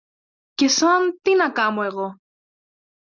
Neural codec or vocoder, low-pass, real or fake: none; 7.2 kHz; real